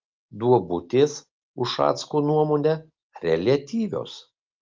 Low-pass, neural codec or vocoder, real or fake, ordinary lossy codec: 7.2 kHz; none; real; Opus, 24 kbps